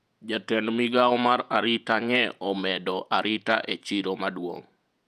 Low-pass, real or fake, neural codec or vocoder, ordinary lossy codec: 14.4 kHz; fake; vocoder, 48 kHz, 128 mel bands, Vocos; none